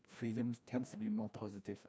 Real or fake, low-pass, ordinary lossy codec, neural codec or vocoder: fake; none; none; codec, 16 kHz, 1 kbps, FreqCodec, larger model